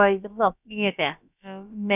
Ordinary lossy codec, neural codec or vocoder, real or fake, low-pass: none; codec, 16 kHz, about 1 kbps, DyCAST, with the encoder's durations; fake; 3.6 kHz